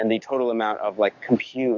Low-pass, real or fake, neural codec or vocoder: 7.2 kHz; fake; codec, 44.1 kHz, 7.8 kbps, DAC